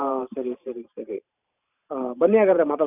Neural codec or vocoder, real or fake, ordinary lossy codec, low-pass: vocoder, 44.1 kHz, 128 mel bands every 512 samples, BigVGAN v2; fake; none; 3.6 kHz